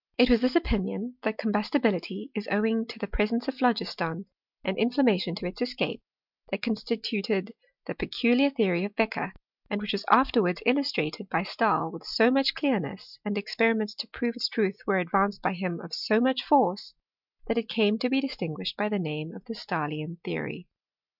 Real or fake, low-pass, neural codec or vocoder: real; 5.4 kHz; none